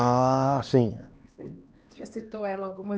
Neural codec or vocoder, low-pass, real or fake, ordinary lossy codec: codec, 16 kHz, 2 kbps, X-Codec, HuBERT features, trained on LibriSpeech; none; fake; none